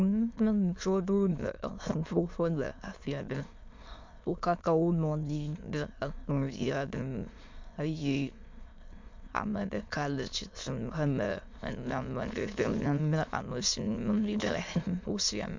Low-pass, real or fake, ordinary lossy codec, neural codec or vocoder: 7.2 kHz; fake; MP3, 48 kbps; autoencoder, 22.05 kHz, a latent of 192 numbers a frame, VITS, trained on many speakers